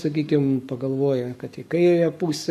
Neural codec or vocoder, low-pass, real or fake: codec, 44.1 kHz, 7.8 kbps, Pupu-Codec; 14.4 kHz; fake